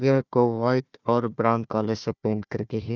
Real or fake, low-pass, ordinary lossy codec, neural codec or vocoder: fake; 7.2 kHz; none; codec, 16 kHz, 1 kbps, FunCodec, trained on Chinese and English, 50 frames a second